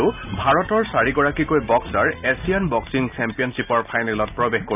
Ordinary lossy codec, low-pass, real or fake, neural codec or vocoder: none; 3.6 kHz; real; none